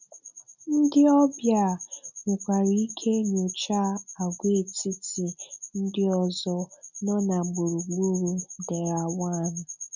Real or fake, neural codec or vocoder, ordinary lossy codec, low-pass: real; none; none; 7.2 kHz